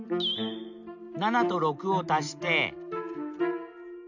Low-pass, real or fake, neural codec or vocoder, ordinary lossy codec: 7.2 kHz; real; none; none